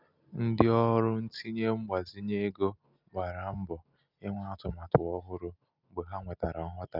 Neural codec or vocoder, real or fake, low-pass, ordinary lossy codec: none; real; 5.4 kHz; none